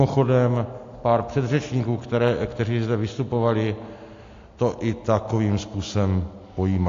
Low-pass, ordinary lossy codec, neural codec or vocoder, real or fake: 7.2 kHz; AAC, 48 kbps; none; real